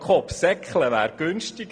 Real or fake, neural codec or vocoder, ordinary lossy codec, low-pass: real; none; none; 9.9 kHz